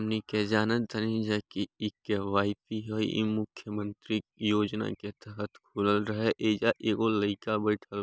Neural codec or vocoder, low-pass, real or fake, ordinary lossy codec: none; none; real; none